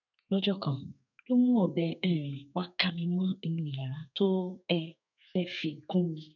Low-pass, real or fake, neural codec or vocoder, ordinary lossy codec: 7.2 kHz; fake; codec, 32 kHz, 1.9 kbps, SNAC; none